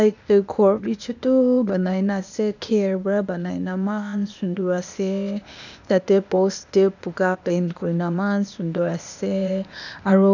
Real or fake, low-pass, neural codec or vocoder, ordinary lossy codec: fake; 7.2 kHz; codec, 16 kHz, 0.8 kbps, ZipCodec; none